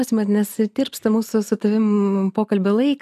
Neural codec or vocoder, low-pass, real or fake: none; 14.4 kHz; real